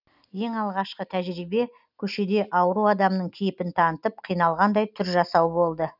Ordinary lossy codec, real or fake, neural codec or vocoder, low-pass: none; real; none; 5.4 kHz